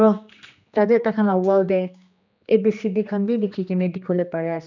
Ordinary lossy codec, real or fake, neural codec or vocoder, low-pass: none; fake; codec, 16 kHz, 2 kbps, X-Codec, HuBERT features, trained on general audio; 7.2 kHz